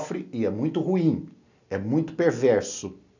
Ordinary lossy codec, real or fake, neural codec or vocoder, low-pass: none; real; none; 7.2 kHz